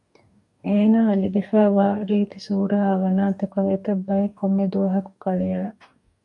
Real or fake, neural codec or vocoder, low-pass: fake; codec, 44.1 kHz, 2.6 kbps, DAC; 10.8 kHz